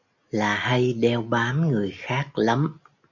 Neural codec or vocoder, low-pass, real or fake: none; 7.2 kHz; real